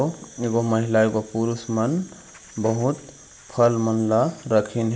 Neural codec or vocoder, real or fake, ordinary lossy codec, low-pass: none; real; none; none